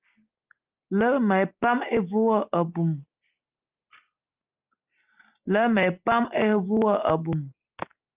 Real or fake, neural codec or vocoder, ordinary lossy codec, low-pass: real; none; Opus, 32 kbps; 3.6 kHz